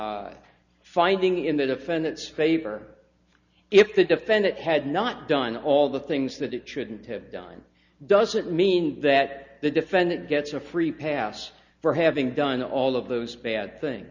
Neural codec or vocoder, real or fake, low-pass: none; real; 7.2 kHz